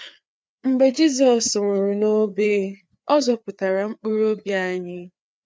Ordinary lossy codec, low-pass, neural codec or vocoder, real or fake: none; none; codec, 16 kHz, 4 kbps, FreqCodec, larger model; fake